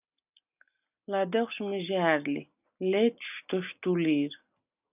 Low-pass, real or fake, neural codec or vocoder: 3.6 kHz; real; none